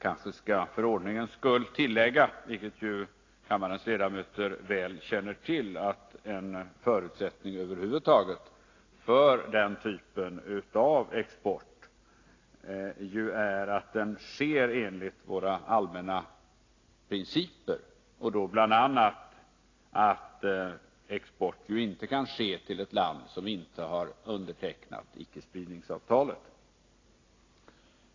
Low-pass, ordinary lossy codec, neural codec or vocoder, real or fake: 7.2 kHz; AAC, 32 kbps; none; real